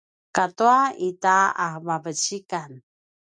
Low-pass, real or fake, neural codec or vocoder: 9.9 kHz; real; none